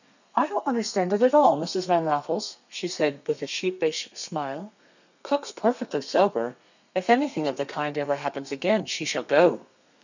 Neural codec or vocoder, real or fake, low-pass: codec, 32 kHz, 1.9 kbps, SNAC; fake; 7.2 kHz